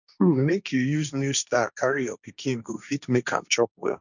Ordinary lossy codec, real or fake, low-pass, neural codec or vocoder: none; fake; 7.2 kHz; codec, 16 kHz, 1.1 kbps, Voila-Tokenizer